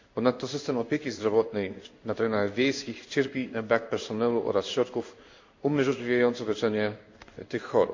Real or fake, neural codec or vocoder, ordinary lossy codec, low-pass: fake; codec, 16 kHz in and 24 kHz out, 1 kbps, XY-Tokenizer; MP3, 64 kbps; 7.2 kHz